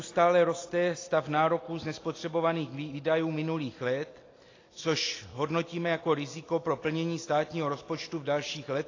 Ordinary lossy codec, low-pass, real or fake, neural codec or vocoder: AAC, 32 kbps; 7.2 kHz; real; none